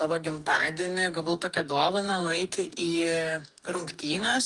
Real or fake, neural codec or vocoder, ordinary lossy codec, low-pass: fake; codec, 44.1 kHz, 2.6 kbps, DAC; Opus, 32 kbps; 10.8 kHz